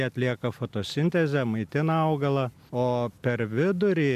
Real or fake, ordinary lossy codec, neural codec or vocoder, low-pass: real; AAC, 96 kbps; none; 14.4 kHz